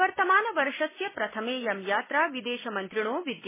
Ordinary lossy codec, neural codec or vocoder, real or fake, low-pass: MP3, 16 kbps; none; real; 3.6 kHz